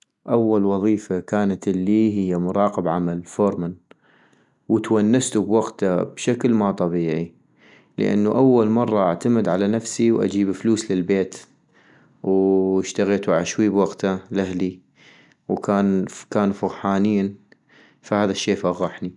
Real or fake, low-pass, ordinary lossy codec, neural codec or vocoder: real; 10.8 kHz; none; none